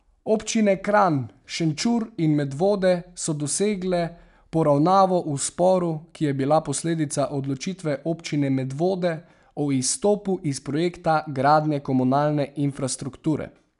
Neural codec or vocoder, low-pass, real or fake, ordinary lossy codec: none; 10.8 kHz; real; none